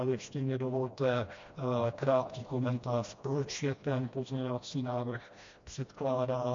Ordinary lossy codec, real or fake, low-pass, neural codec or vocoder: MP3, 48 kbps; fake; 7.2 kHz; codec, 16 kHz, 1 kbps, FreqCodec, smaller model